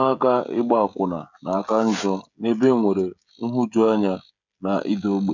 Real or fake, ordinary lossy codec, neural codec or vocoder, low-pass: fake; none; codec, 16 kHz, 16 kbps, FreqCodec, smaller model; 7.2 kHz